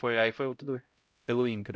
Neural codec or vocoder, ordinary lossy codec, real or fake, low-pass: codec, 16 kHz, 0.5 kbps, X-Codec, HuBERT features, trained on LibriSpeech; none; fake; none